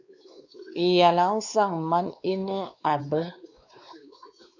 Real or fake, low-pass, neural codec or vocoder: fake; 7.2 kHz; codec, 16 kHz, 2 kbps, X-Codec, WavLM features, trained on Multilingual LibriSpeech